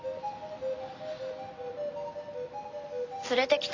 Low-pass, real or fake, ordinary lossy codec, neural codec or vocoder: 7.2 kHz; real; AAC, 32 kbps; none